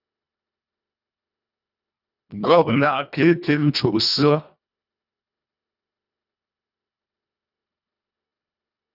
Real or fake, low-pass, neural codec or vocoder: fake; 5.4 kHz; codec, 24 kHz, 1.5 kbps, HILCodec